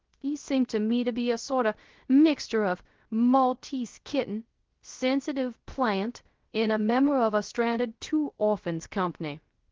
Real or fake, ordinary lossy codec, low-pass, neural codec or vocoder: fake; Opus, 16 kbps; 7.2 kHz; codec, 16 kHz, 0.3 kbps, FocalCodec